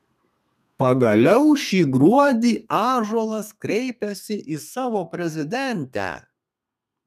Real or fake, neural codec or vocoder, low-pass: fake; codec, 32 kHz, 1.9 kbps, SNAC; 14.4 kHz